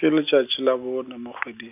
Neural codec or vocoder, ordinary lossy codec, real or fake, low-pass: none; none; real; 3.6 kHz